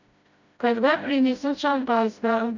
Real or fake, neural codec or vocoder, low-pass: fake; codec, 16 kHz, 0.5 kbps, FreqCodec, smaller model; 7.2 kHz